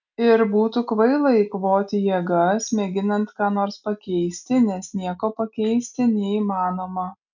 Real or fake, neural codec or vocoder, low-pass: real; none; 7.2 kHz